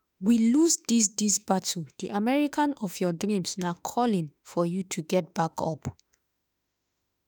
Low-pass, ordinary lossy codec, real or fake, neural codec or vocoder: none; none; fake; autoencoder, 48 kHz, 32 numbers a frame, DAC-VAE, trained on Japanese speech